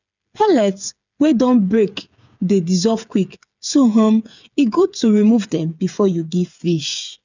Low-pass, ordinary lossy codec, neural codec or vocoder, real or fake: 7.2 kHz; none; codec, 16 kHz, 8 kbps, FreqCodec, smaller model; fake